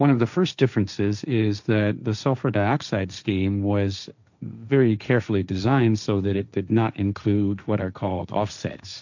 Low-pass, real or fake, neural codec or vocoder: 7.2 kHz; fake; codec, 16 kHz, 1.1 kbps, Voila-Tokenizer